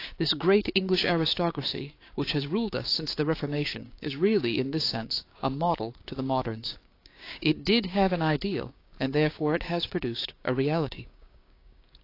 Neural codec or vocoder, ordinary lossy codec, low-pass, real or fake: codec, 16 kHz, 6 kbps, DAC; AAC, 32 kbps; 5.4 kHz; fake